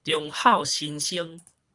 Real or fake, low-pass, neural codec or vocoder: fake; 10.8 kHz; codec, 24 kHz, 3 kbps, HILCodec